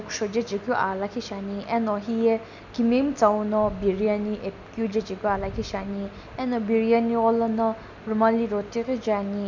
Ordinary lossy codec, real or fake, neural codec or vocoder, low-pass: none; real; none; 7.2 kHz